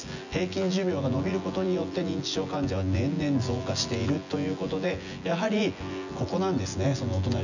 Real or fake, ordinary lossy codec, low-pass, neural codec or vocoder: fake; none; 7.2 kHz; vocoder, 24 kHz, 100 mel bands, Vocos